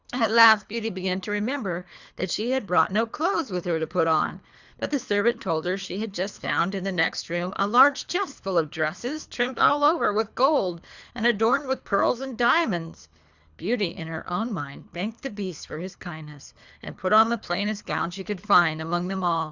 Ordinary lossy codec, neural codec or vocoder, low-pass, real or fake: Opus, 64 kbps; codec, 24 kHz, 3 kbps, HILCodec; 7.2 kHz; fake